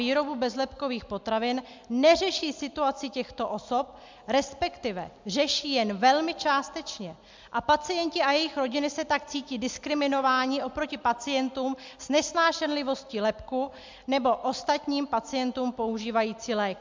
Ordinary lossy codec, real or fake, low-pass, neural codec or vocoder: MP3, 64 kbps; real; 7.2 kHz; none